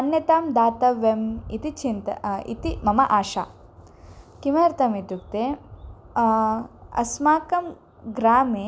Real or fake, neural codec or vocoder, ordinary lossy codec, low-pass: real; none; none; none